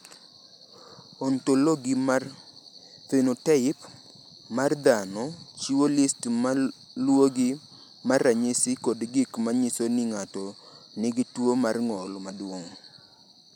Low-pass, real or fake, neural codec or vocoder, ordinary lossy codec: 19.8 kHz; real; none; none